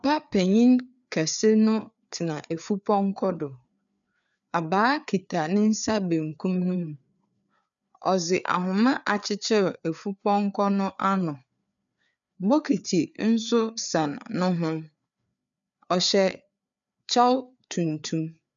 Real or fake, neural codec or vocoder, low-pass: fake; codec, 16 kHz, 4 kbps, FreqCodec, larger model; 7.2 kHz